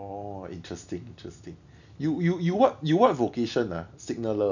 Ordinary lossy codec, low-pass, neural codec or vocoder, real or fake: none; 7.2 kHz; none; real